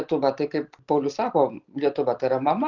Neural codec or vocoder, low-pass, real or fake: none; 7.2 kHz; real